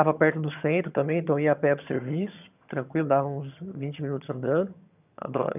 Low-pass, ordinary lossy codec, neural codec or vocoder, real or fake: 3.6 kHz; none; vocoder, 22.05 kHz, 80 mel bands, HiFi-GAN; fake